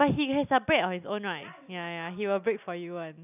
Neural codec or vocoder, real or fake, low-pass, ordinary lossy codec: none; real; 3.6 kHz; none